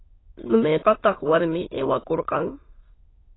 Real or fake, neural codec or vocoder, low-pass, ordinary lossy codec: fake; autoencoder, 22.05 kHz, a latent of 192 numbers a frame, VITS, trained on many speakers; 7.2 kHz; AAC, 16 kbps